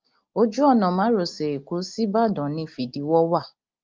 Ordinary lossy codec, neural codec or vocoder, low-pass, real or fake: Opus, 24 kbps; none; 7.2 kHz; real